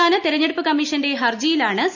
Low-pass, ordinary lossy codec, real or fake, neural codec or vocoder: 7.2 kHz; none; real; none